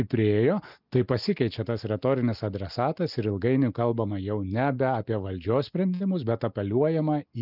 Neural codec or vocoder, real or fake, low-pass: none; real; 5.4 kHz